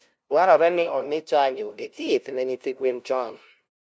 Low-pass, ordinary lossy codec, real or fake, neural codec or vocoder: none; none; fake; codec, 16 kHz, 0.5 kbps, FunCodec, trained on LibriTTS, 25 frames a second